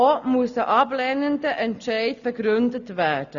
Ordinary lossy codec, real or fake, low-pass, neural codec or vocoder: none; real; 7.2 kHz; none